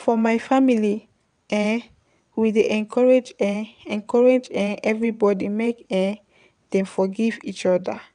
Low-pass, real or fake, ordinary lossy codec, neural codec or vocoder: 9.9 kHz; fake; none; vocoder, 22.05 kHz, 80 mel bands, WaveNeXt